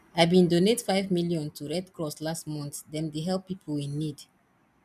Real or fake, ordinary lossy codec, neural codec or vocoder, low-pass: real; none; none; 14.4 kHz